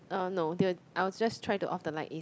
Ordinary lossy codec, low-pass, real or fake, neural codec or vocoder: none; none; real; none